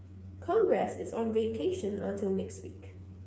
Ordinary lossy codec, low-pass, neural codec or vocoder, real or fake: none; none; codec, 16 kHz, 4 kbps, FreqCodec, smaller model; fake